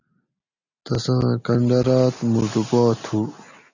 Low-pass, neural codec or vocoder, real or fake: 7.2 kHz; none; real